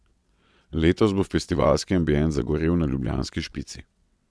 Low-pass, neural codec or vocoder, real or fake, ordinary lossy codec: none; vocoder, 22.05 kHz, 80 mel bands, WaveNeXt; fake; none